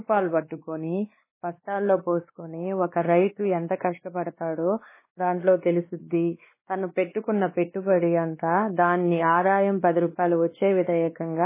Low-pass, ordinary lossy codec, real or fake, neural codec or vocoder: 3.6 kHz; MP3, 16 kbps; fake; codec, 16 kHz, 2 kbps, X-Codec, WavLM features, trained on Multilingual LibriSpeech